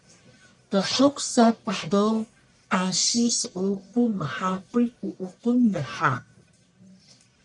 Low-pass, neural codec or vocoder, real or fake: 10.8 kHz; codec, 44.1 kHz, 1.7 kbps, Pupu-Codec; fake